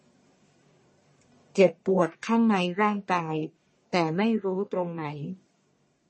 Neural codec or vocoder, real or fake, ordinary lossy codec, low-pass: codec, 44.1 kHz, 1.7 kbps, Pupu-Codec; fake; MP3, 32 kbps; 10.8 kHz